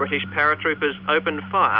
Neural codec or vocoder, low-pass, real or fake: none; 5.4 kHz; real